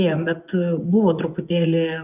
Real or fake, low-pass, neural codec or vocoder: fake; 3.6 kHz; vocoder, 24 kHz, 100 mel bands, Vocos